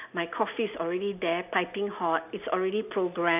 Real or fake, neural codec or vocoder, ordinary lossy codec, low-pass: real; none; none; 3.6 kHz